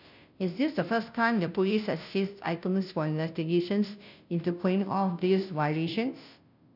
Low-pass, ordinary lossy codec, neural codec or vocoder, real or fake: 5.4 kHz; none; codec, 16 kHz, 0.5 kbps, FunCodec, trained on Chinese and English, 25 frames a second; fake